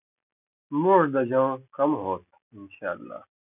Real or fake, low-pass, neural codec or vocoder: real; 3.6 kHz; none